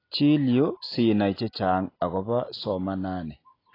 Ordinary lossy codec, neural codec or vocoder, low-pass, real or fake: AAC, 24 kbps; none; 5.4 kHz; real